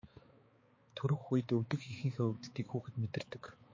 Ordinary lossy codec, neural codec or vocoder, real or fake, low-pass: MP3, 32 kbps; codec, 16 kHz, 4 kbps, X-Codec, HuBERT features, trained on balanced general audio; fake; 7.2 kHz